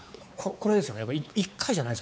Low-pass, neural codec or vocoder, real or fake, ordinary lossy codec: none; codec, 16 kHz, 4 kbps, X-Codec, WavLM features, trained on Multilingual LibriSpeech; fake; none